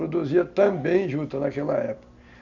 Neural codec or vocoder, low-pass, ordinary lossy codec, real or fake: none; 7.2 kHz; none; real